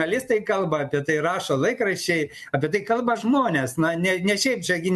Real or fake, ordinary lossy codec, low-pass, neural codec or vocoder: real; MP3, 64 kbps; 14.4 kHz; none